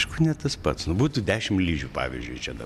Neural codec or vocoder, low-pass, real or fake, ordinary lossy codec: none; 14.4 kHz; real; MP3, 96 kbps